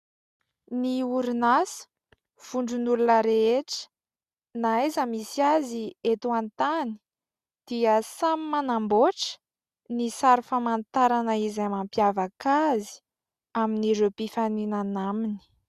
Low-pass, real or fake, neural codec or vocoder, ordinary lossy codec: 14.4 kHz; real; none; Opus, 64 kbps